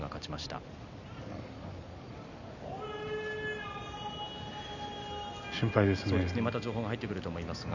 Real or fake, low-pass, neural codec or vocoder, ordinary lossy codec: real; 7.2 kHz; none; none